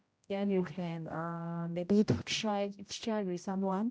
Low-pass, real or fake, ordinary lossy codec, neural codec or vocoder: none; fake; none; codec, 16 kHz, 0.5 kbps, X-Codec, HuBERT features, trained on general audio